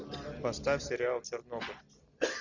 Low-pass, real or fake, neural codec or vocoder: 7.2 kHz; real; none